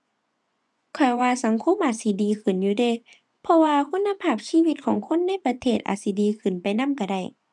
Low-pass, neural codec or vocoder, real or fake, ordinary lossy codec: none; vocoder, 24 kHz, 100 mel bands, Vocos; fake; none